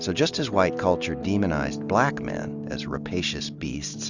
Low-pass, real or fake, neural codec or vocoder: 7.2 kHz; real; none